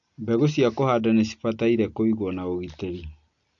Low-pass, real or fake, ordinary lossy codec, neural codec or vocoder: 7.2 kHz; real; AAC, 64 kbps; none